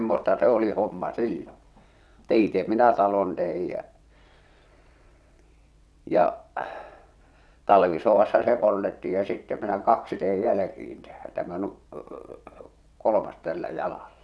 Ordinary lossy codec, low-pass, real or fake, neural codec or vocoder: none; none; fake; vocoder, 22.05 kHz, 80 mel bands, WaveNeXt